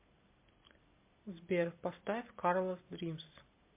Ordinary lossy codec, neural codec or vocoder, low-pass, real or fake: MP3, 24 kbps; none; 3.6 kHz; real